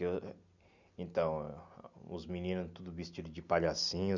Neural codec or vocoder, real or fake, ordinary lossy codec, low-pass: none; real; none; 7.2 kHz